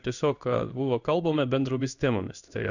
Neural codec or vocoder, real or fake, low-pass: codec, 16 kHz in and 24 kHz out, 1 kbps, XY-Tokenizer; fake; 7.2 kHz